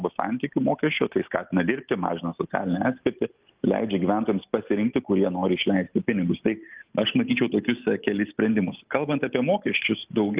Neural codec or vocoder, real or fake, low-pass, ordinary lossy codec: none; real; 3.6 kHz; Opus, 32 kbps